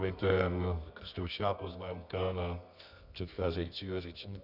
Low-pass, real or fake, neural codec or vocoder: 5.4 kHz; fake; codec, 24 kHz, 0.9 kbps, WavTokenizer, medium music audio release